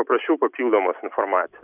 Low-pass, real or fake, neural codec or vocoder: 3.6 kHz; real; none